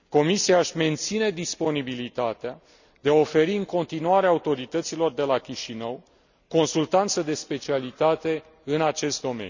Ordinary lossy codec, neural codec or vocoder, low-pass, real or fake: none; none; 7.2 kHz; real